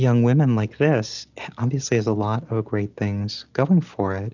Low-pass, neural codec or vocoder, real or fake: 7.2 kHz; none; real